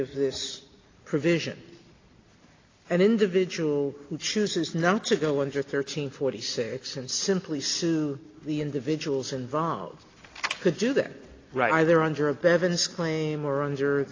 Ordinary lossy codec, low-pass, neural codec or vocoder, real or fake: AAC, 32 kbps; 7.2 kHz; none; real